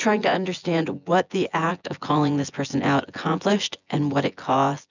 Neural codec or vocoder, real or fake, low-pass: vocoder, 24 kHz, 100 mel bands, Vocos; fake; 7.2 kHz